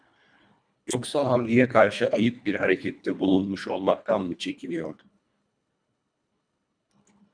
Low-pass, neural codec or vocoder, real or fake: 9.9 kHz; codec, 24 kHz, 1.5 kbps, HILCodec; fake